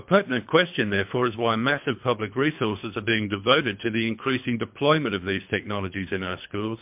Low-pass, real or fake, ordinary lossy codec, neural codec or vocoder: 3.6 kHz; fake; MP3, 32 kbps; codec, 24 kHz, 3 kbps, HILCodec